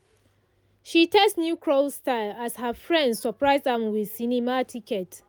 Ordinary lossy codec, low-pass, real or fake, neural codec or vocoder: none; none; real; none